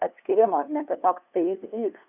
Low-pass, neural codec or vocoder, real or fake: 3.6 kHz; codec, 16 kHz, 2 kbps, FunCodec, trained on LibriTTS, 25 frames a second; fake